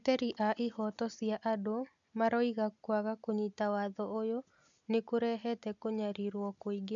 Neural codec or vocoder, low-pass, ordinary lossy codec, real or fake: none; 7.2 kHz; none; real